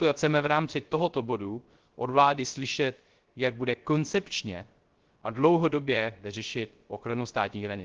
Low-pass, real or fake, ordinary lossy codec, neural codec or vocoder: 7.2 kHz; fake; Opus, 16 kbps; codec, 16 kHz, 0.3 kbps, FocalCodec